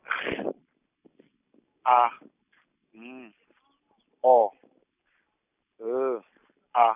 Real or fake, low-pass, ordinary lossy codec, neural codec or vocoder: real; 3.6 kHz; none; none